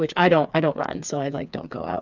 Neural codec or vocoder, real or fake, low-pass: codec, 16 kHz, 4 kbps, FreqCodec, smaller model; fake; 7.2 kHz